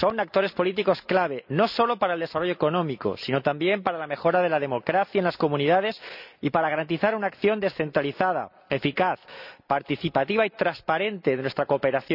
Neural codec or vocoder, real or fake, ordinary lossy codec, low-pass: none; real; none; 5.4 kHz